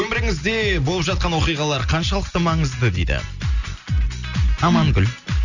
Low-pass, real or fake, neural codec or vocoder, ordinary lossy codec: 7.2 kHz; real; none; none